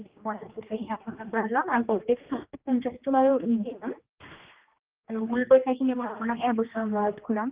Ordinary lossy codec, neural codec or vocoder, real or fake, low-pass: Opus, 32 kbps; codec, 16 kHz, 2 kbps, X-Codec, HuBERT features, trained on general audio; fake; 3.6 kHz